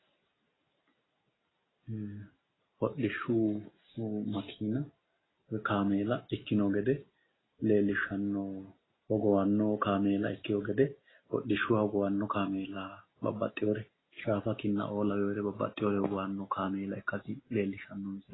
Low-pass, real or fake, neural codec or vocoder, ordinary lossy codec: 7.2 kHz; real; none; AAC, 16 kbps